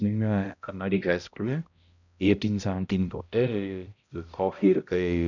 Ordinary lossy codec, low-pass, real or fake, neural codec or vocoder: none; 7.2 kHz; fake; codec, 16 kHz, 0.5 kbps, X-Codec, HuBERT features, trained on balanced general audio